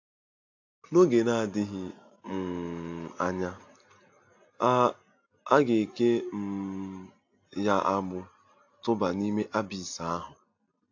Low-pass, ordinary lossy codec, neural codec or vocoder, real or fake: 7.2 kHz; AAC, 48 kbps; none; real